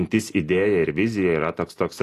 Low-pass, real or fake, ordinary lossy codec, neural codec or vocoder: 14.4 kHz; real; AAC, 48 kbps; none